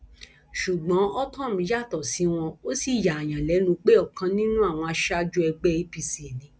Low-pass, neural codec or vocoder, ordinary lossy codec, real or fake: none; none; none; real